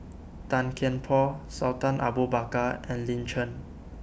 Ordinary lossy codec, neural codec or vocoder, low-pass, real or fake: none; none; none; real